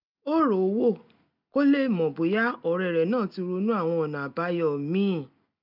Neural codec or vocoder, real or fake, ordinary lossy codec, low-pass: none; real; none; 5.4 kHz